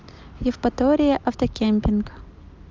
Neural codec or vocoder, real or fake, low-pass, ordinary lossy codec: none; real; 7.2 kHz; Opus, 32 kbps